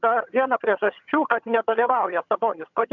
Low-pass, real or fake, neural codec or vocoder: 7.2 kHz; fake; vocoder, 22.05 kHz, 80 mel bands, HiFi-GAN